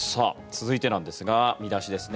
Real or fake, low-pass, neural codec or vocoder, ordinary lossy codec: real; none; none; none